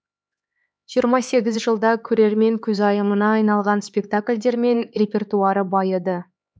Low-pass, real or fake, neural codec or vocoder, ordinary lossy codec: none; fake; codec, 16 kHz, 4 kbps, X-Codec, HuBERT features, trained on LibriSpeech; none